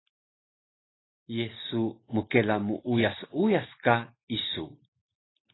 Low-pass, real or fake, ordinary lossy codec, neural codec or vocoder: 7.2 kHz; real; AAC, 16 kbps; none